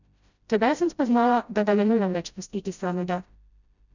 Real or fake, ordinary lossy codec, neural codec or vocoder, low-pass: fake; none; codec, 16 kHz, 0.5 kbps, FreqCodec, smaller model; 7.2 kHz